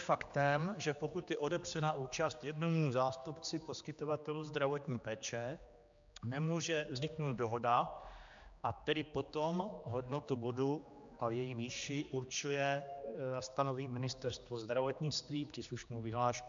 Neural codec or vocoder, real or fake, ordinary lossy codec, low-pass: codec, 16 kHz, 2 kbps, X-Codec, HuBERT features, trained on general audio; fake; MP3, 64 kbps; 7.2 kHz